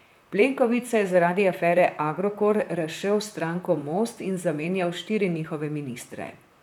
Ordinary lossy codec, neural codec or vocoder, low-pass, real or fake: none; vocoder, 44.1 kHz, 128 mel bands, Pupu-Vocoder; 19.8 kHz; fake